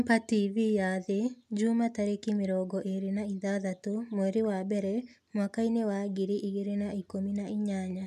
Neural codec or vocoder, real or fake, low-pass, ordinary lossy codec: none; real; 14.4 kHz; MP3, 96 kbps